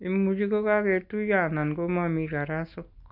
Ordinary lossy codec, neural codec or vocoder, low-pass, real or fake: none; none; 5.4 kHz; real